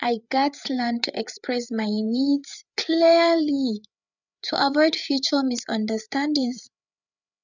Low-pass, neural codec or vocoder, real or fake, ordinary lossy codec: 7.2 kHz; codec, 16 kHz, 8 kbps, FreqCodec, larger model; fake; none